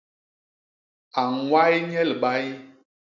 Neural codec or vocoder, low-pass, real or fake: none; 7.2 kHz; real